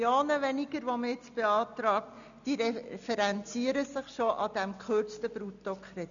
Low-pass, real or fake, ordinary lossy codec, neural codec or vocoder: 7.2 kHz; real; none; none